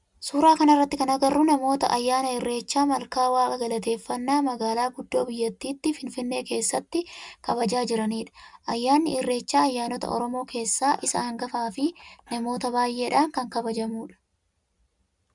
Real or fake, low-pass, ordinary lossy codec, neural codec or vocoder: real; 10.8 kHz; MP3, 96 kbps; none